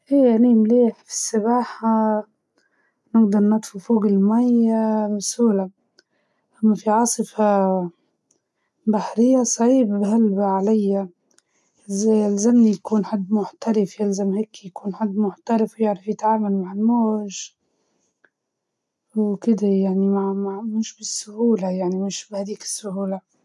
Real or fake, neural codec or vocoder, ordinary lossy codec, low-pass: real; none; none; none